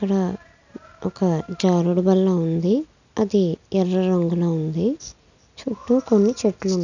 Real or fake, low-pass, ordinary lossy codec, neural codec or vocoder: real; 7.2 kHz; none; none